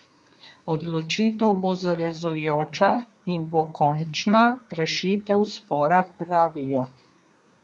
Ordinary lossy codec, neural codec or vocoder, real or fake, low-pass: none; codec, 24 kHz, 1 kbps, SNAC; fake; 10.8 kHz